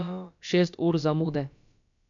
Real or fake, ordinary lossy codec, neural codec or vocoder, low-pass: fake; MP3, 64 kbps; codec, 16 kHz, about 1 kbps, DyCAST, with the encoder's durations; 7.2 kHz